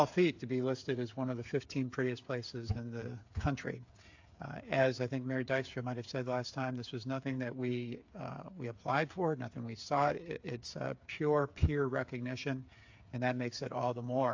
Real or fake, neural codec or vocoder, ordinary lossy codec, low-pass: fake; codec, 16 kHz, 8 kbps, FreqCodec, smaller model; AAC, 48 kbps; 7.2 kHz